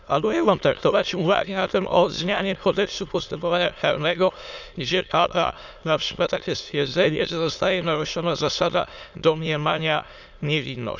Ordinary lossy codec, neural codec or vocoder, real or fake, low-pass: none; autoencoder, 22.05 kHz, a latent of 192 numbers a frame, VITS, trained on many speakers; fake; 7.2 kHz